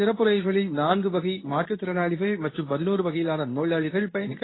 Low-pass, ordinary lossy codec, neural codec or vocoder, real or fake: 7.2 kHz; AAC, 16 kbps; codec, 24 kHz, 0.9 kbps, WavTokenizer, medium speech release version 2; fake